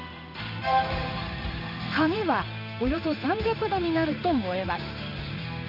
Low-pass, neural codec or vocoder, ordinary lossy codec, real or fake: 5.4 kHz; codec, 16 kHz in and 24 kHz out, 1 kbps, XY-Tokenizer; none; fake